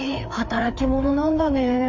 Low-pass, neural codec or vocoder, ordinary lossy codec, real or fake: 7.2 kHz; codec, 16 kHz, 4 kbps, FreqCodec, larger model; MP3, 48 kbps; fake